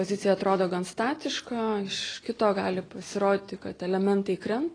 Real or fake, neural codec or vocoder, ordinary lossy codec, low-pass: real; none; AAC, 32 kbps; 9.9 kHz